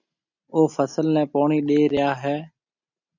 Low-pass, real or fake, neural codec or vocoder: 7.2 kHz; real; none